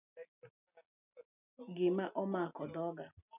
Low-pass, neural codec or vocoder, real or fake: 3.6 kHz; none; real